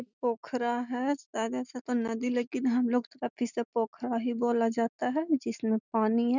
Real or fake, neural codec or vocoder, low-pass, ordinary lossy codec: real; none; 7.2 kHz; none